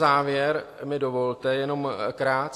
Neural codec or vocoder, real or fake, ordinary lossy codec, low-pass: none; real; AAC, 64 kbps; 14.4 kHz